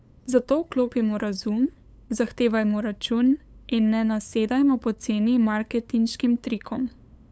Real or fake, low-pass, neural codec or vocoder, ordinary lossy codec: fake; none; codec, 16 kHz, 8 kbps, FunCodec, trained on LibriTTS, 25 frames a second; none